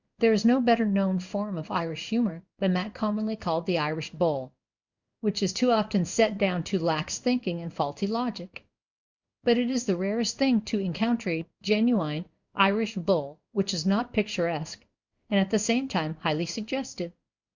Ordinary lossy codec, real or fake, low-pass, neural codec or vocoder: Opus, 64 kbps; fake; 7.2 kHz; codec, 16 kHz in and 24 kHz out, 1 kbps, XY-Tokenizer